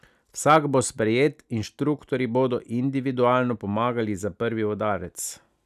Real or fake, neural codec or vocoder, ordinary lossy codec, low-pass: real; none; none; 14.4 kHz